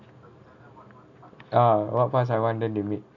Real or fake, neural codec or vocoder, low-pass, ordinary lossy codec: real; none; 7.2 kHz; none